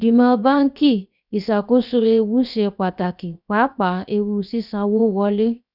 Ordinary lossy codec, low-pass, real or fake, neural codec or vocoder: none; 5.4 kHz; fake; codec, 16 kHz, 0.7 kbps, FocalCodec